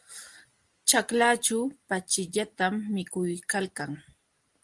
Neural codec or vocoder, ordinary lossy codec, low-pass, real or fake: none; Opus, 24 kbps; 10.8 kHz; real